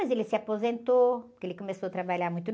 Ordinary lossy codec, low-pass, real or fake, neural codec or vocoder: none; none; real; none